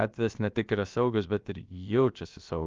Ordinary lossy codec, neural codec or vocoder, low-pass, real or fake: Opus, 32 kbps; codec, 16 kHz, about 1 kbps, DyCAST, with the encoder's durations; 7.2 kHz; fake